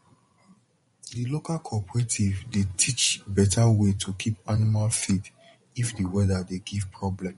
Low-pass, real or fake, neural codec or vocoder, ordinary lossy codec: 10.8 kHz; fake; vocoder, 24 kHz, 100 mel bands, Vocos; MP3, 48 kbps